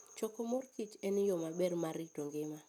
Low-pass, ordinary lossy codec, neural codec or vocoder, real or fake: 19.8 kHz; none; none; real